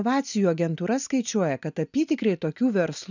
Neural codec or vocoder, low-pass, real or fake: none; 7.2 kHz; real